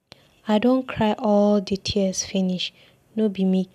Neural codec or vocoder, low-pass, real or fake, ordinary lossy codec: none; 14.4 kHz; real; none